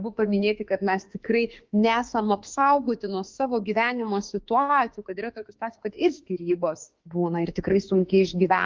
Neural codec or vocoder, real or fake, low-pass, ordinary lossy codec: autoencoder, 48 kHz, 32 numbers a frame, DAC-VAE, trained on Japanese speech; fake; 7.2 kHz; Opus, 32 kbps